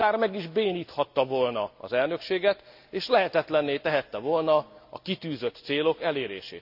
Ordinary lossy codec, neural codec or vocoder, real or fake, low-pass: none; none; real; 5.4 kHz